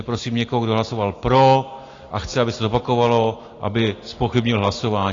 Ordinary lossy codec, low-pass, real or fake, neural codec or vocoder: AAC, 32 kbps; 7.2 kHz; real; none